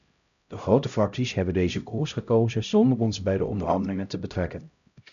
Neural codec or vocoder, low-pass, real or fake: codec, 16 kHz, 0.5 kbps, X-Codec, HuBERT features, trained on LibriSpeech; 7.2 kHz; fake